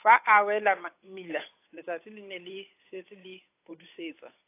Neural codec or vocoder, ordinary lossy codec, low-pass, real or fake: codec, 16 kHz, 8 kbps, FunCodec, trained on Chinese and English, 25 frames a second; AAC, 24 kbps; 3.6 kHz; fake